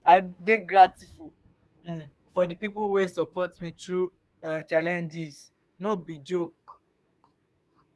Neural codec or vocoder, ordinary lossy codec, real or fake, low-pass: codec, 24 kHz, 1 kbps, SNAC; none; fake; none